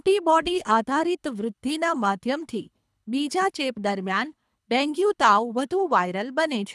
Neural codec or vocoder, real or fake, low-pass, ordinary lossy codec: codec, 24 kHz, 3 kbps, HILCodec; fake; none; none